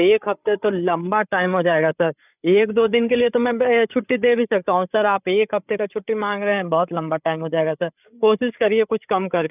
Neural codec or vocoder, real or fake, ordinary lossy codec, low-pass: codec, 16 kHz, 8 kbps, FreqCodec, larger model; fake; none; 3.6 kHz